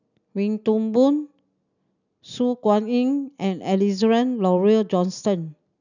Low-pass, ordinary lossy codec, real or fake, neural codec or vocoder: 7.2 kHz; none; real; none